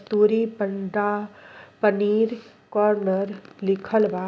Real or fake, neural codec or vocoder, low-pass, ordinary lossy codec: real; none; none; none